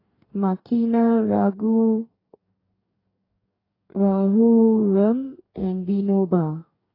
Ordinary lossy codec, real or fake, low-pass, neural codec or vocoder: AAC, 24 kbps; fake; 5.4 kHz; codec, 44.1 kHz, 2.6 kbps, DAC